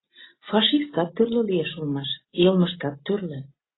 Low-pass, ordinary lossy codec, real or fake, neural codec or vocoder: 7.2 kHz; AAC, 16 kbps; real; none